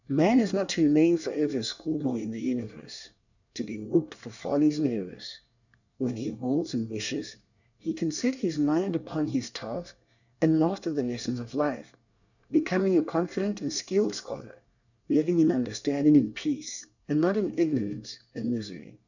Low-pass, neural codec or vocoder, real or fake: 7.2 kHz; codec, 24 kHz, 1 kbps, SNAC; fake